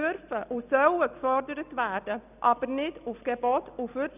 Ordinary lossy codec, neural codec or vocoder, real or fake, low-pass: none; none; real; 3.6 kHz